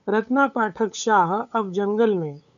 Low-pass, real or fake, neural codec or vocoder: 7.2 kHz; fake; codec, 16 kHz, 8 kbps, FunCodec, trained on LibriTTS, 25 frames a second